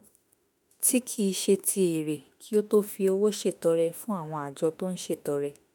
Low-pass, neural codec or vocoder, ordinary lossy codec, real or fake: none; autoencoder, 48 kHz, 32 numbers a frame, DAC-VAE, trained on Japanese speech; none; fake